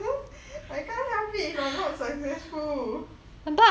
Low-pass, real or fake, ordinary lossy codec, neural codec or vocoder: none; real; none; none